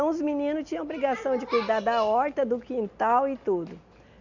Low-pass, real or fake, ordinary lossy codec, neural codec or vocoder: 7.2 kHz; real; none; none